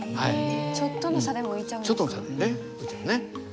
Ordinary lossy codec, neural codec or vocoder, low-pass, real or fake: none; none; none; real